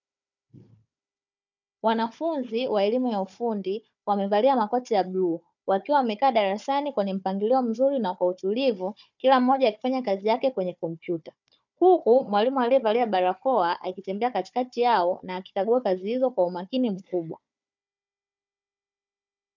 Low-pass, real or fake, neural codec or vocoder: 7.2 kHz; fake; codec, 16 kHz, 4 kbps, FunCodec, trained on Chinese and English, 50 frames a second